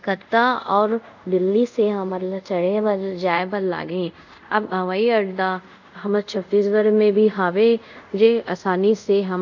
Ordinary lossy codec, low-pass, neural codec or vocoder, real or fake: none; 7.2 kHz; codec, 24 kHz, 0.5 kbps, DualCodec; fake